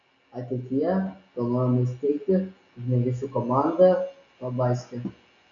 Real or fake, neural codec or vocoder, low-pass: real; none; 7.2 kHz